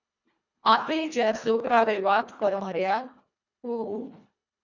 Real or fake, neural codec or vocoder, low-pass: fake; codec, 24 kHz, 1.5 kbps, HILCodec; 7.2 kHz